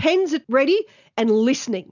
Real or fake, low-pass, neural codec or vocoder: real; 7.2 kHz; none